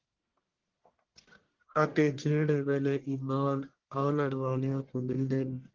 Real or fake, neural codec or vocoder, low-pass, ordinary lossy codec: fake; codec, 44.1 kHz, 1.7 kbps, Pupu-Codec; 7.2 kHz; Opus, 16 kbps